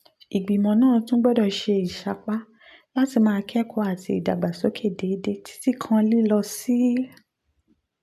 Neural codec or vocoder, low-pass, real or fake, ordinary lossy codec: none; 14.4 kHz; real; MP3, 96 kbps